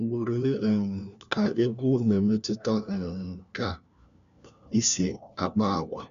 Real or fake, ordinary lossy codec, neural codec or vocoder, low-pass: fake; none; codec, 16 kHz, 1 kbps, FunCodec, trained on LibriTTS, 50 frames a second; 7.2 kHz